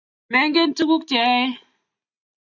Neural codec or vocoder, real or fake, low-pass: none; real; 7.2 kHz